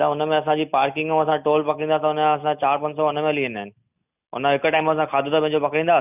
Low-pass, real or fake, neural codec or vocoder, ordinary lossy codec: 3.6 kHz; real; none; none